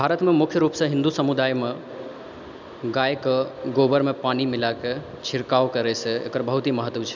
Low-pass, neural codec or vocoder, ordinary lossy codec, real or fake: 7.2 kHz; none; none; real